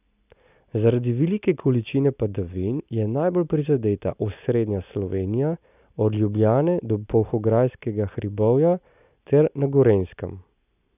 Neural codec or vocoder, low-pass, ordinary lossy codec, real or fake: none; 3.6 kHz; none; real